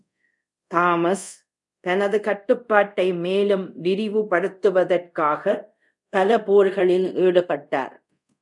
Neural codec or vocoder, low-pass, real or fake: codec, 24 kHz, 0.5 kbps, DualCodec; 10.8 kHz; fake